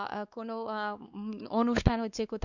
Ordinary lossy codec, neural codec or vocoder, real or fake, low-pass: Opus, 64 kbps; codec, 16 kHz, 2 kbps, X-Codec, WavLM features, trained on Multilingual LibriSpeech; fake; 7.2 kHz